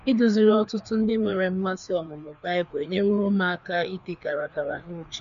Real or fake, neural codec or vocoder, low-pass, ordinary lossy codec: fake; codec, 16 kHz, 2 kbps, FreqCodec, larger model; 7.2 kHz; none